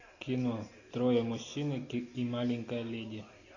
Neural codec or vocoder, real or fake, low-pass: none; real; 7.2 kHz